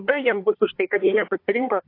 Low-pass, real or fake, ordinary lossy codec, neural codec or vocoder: 5.4 kHz; fake; AAC, 32 kbps; codec, 24 kHz, 1 kbps, SNAC